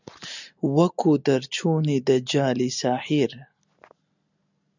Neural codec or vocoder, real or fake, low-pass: none; real; 7.2 kHz